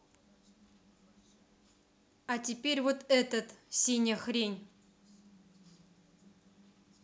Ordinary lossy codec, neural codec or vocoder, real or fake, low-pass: none; none; real; none